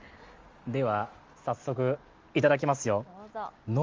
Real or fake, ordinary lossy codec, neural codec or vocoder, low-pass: real; Opus, 32 kbps; none; 7.2 kHz